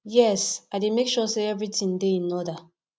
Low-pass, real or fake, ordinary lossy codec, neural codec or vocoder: none; real; none; none